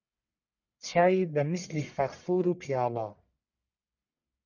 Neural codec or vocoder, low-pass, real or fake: codec, 44.1 kHz, 1.7 kbps, Pupu-Codec; 7.2 kHz; fake